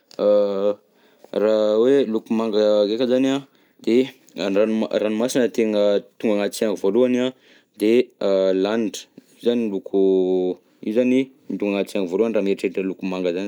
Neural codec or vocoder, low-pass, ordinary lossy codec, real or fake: none; 19.8 kHz; none; real